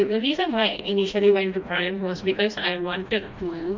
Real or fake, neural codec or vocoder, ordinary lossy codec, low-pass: fake; codec, 16 kHz, 1 kbps, FreqCodec, smaller model; MP3, 48 kbps; 7.2 kHz